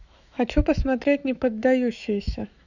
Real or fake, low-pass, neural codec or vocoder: fake; 7.2 kHz; autoencoder, 48 kHz, 128 numbers a frame, DAC-VAE, trained on Japanese speech